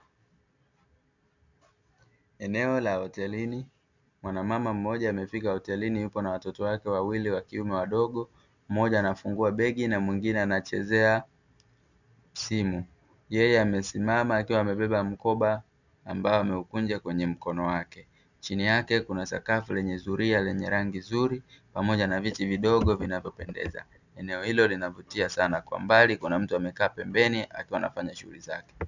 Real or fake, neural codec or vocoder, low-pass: real; none; 7.2 kHz